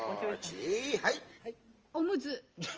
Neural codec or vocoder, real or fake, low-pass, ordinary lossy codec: none; real; 7.2 kHz; Opus, 24 kbps